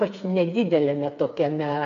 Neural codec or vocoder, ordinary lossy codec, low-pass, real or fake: codec, 16 kHz, 4 kbps, FreqCodec, smaller model; MP3, 48 kbps; 7.2 kHz; fake